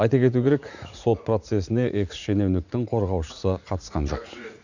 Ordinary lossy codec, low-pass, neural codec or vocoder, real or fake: none; 7.2 kHz; vocoder, 44.1 kHz, 80 mel bands, Vocos; fake